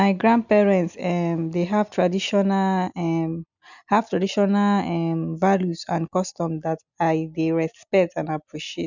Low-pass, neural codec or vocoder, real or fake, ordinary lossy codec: 7.2 kHz; none; real; none